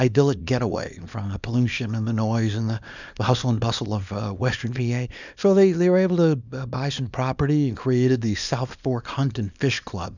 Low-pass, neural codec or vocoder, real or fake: 7.2 kHz; codec, 24 kHz, 0.9 kbps, WavTokenizer, small release; fake